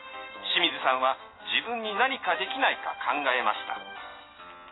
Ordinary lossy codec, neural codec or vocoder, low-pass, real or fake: AAC, 16 kbps; none; 7.2 kHz; real